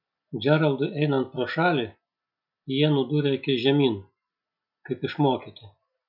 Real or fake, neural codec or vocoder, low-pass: real; none; 5.4 kHz